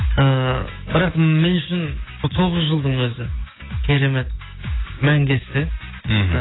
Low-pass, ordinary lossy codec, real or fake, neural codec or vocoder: 7.2 kHz; AAC, 16 kbps; fake; codec, 16 kHz, 6 kbps, DAC